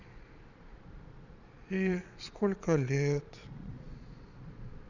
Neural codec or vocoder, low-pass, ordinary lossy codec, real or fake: vocoder, 22.05 kHz, 80 mel bands, Vocos; 7.2 kHz; none; fake